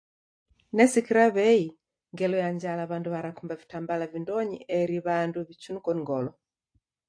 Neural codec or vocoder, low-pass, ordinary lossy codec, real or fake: none; 9.9 kHz; AAC, 48 kbps; real